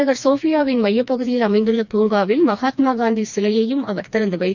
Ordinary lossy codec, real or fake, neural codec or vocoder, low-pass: none; fake; codec, 16 kHz, 2 kbps, FreqCodec, smaller model; 7.2 kHz